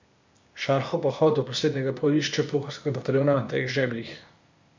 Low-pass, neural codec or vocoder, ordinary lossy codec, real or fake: 7.2 kHz; codec, 16 kHz, 0.8 kbps, ZipCodec; MP3, 64 kbps; fake